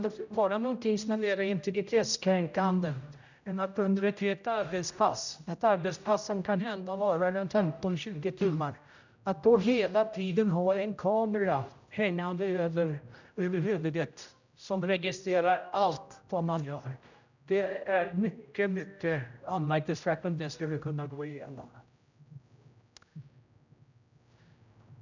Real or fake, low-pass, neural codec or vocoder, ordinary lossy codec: fake; 7.2 kHz; codec, 16 kHz, 0.5 kbps, X-Codec, HuBERT features, trained on general audio; none